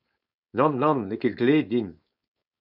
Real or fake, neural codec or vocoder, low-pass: fake; codec, 16 kHz, 4.8 kbps, FACodec; 5.4 kHz